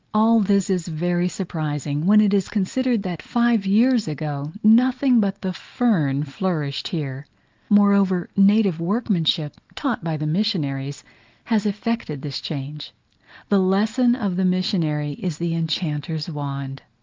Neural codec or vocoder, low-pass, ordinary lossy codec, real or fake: none; 7.2 kHz; Opus, 32 kbps; real